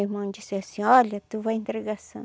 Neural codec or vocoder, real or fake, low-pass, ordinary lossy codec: none; real; none; none